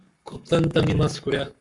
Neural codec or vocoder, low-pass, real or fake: codec, 44.1 kHz, 7.8 kbps, Pupu-Codec; 10.8 kHz; fake